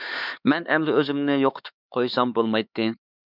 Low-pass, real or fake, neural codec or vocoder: 5.4 kHz; fake; codec, 16 kHz, 4 kbps, X-Codec, HuBERT features, trained on LibriSpeech